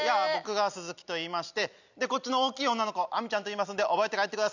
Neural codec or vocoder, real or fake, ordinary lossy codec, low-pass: none; real; none; 7.2 kHz